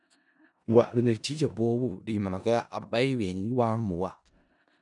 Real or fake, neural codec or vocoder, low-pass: fake; codec, 16 kHz in and 24 kHz out, 0.4 kbps, LongCat-Audio-Codec, four codebook decoder; 10.8 kHz